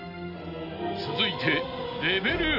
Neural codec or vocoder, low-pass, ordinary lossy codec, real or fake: none; 5.4 kHz; none; real